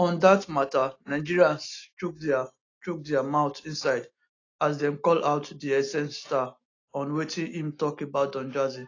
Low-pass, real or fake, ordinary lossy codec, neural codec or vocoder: 7.2 kHz; real; AAC, 32 kbps; none